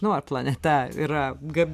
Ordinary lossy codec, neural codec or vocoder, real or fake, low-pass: MP3, 96 kbps; none; real; 14.4 kHz